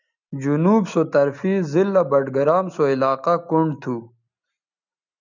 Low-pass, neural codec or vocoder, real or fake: 7.2 kHz; none; real